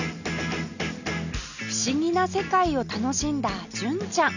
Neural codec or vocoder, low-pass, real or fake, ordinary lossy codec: none; 7.2 kHz; real; none